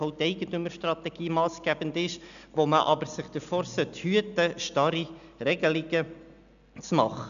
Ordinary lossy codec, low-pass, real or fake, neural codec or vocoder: none; 7.2 kHz; real; none